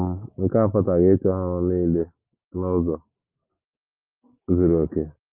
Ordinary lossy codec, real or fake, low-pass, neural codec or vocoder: Opus, 32 kbps; real; 3.6 kHz; none